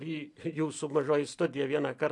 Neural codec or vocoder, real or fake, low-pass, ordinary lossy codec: vocoder, 44.1 kHz, 128 mel bands every 256 samples, BigVGAN v2; fake; 10.8 kHz; AAC, 64 kbps